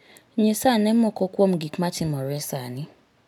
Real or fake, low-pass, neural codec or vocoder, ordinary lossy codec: real; 19.8 kHz; none; none